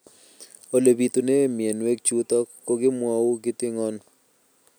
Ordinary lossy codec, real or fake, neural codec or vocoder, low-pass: none; real; none; none